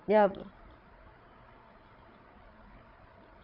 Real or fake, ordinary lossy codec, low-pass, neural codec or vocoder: fake; none; 5.4 kHz; codec, 16 kHz, 8 kbps, FreqCodec, larger model